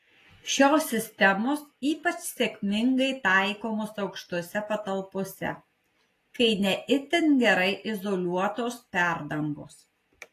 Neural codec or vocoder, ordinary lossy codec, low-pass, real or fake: none; AAC, 48 kbps; 14.4 kHz; real